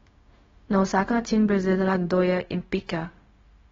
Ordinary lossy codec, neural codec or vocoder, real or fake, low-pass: AAC, 24 kbps; codec, 16 kHz, 0.4 kbps, LongCat-Audio-Codec; fake; 7.2 kHz